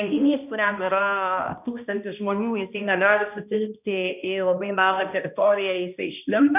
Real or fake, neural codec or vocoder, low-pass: fake; codec, 16 kHz, 1 kbps, X-Codec, HuBERT features, trained on balanced general audio; 3.6 kHz